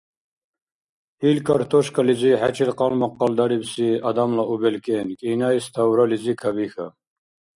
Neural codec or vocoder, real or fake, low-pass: none; real; 10.8 kHz